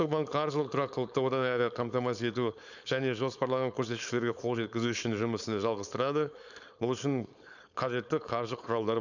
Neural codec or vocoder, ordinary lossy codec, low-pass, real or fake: codec, 16 kHz, 4.8 kbps, FACodec; none; 7.2 kHz; fake